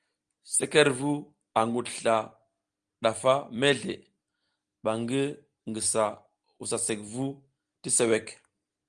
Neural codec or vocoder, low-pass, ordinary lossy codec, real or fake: none; 9.9 kHz; Opus, 24 kbps; real